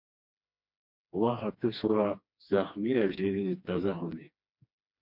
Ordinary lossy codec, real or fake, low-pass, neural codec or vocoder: MP3, 48 kbps; fake; 5.4 kHz; codec, 16 kHz, 2 kbps, FreqCodec, smaller model